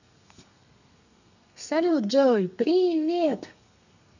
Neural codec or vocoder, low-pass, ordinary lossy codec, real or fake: codec, 32 kHz, 1.9 kbps, SNAC; 7.2 kHz; none; fake